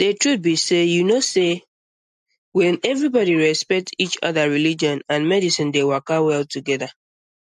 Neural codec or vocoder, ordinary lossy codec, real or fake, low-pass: none; MP3, 64 kbps; real; 14.4 kHz